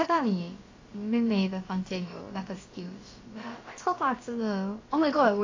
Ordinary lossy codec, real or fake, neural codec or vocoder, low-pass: AAC, 32 kbps; fake; codec, 16 kHz, about 1 kbps, DyCAST, with the encoder's durations; 7.2 kHz